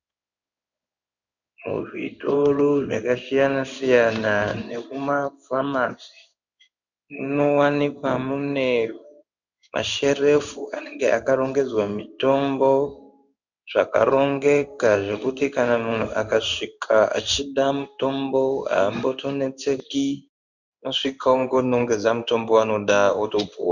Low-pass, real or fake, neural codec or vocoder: 7.2 kHz; fake; codec, 16 kHz in and 24 kHz out, 1 kbps, XY-Tokenizer